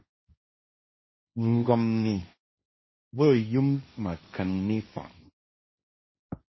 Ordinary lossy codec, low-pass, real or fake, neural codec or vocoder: MP3, 24 kbps; 7.2 kHz; fake; codec, 16 kHz, 1.1 kbps, Voila-Tokenizer